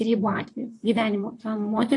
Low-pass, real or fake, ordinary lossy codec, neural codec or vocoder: 10.8 kHz; fake; AAC, 48 kbps; vocoder, 44.1 kHz, 128 mel bands, Pupu-Vocoder